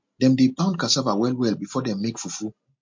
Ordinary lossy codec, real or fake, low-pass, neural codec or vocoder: MP3, 48 kbps; real; 7.2 kHz; none